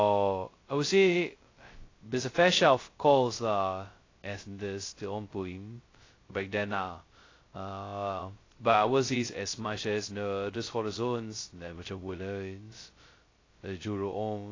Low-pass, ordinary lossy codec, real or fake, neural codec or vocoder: 7.2 kHz; AAC, 32 kbps; fake; codec, 16 kHz, 0.2 kbps, FocalCodec